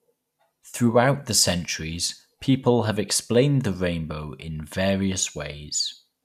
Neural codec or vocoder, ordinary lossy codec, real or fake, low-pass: none; none; real; 14.4 kHz